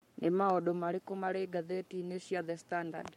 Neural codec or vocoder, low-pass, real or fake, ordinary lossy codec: codec, 44.1 kHz, 7.8 kbps, Pupu-Codec; 19.8 kHz; fake; MP3, 64 kbps